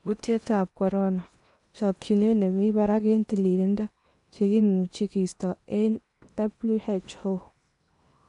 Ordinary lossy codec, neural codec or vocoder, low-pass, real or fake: none; codec, 16 kHz in and 24 kHz out, 0.8 kbps, FocalCodec, streaming, 65536 codes; 10.8 kHz; fake